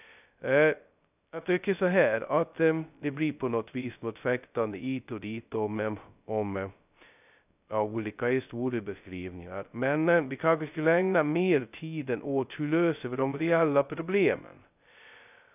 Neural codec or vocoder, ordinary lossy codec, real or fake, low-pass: codec, 16 kHz, 0.2 kbps, FocalCodec; none; fake; 3.6 kHz